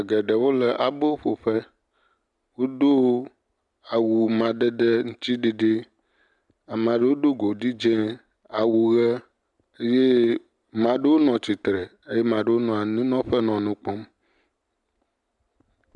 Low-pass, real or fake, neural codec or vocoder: 10.8 kHz; real; none